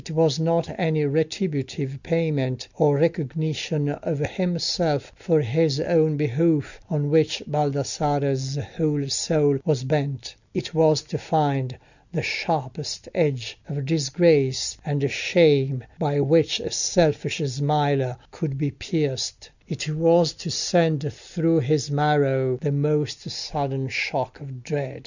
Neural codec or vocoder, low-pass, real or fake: none; 7.2 kHz; real